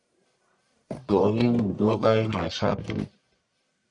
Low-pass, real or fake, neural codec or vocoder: 10.8 kHz; fake; codec, 44.1 kHz, 1.7 kbps, Pupu-Codec